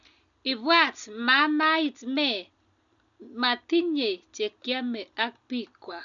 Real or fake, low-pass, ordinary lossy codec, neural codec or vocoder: real; 7.2 kHz; none; none